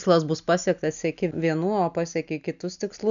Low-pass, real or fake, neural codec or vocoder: 7.2 kHz; real; none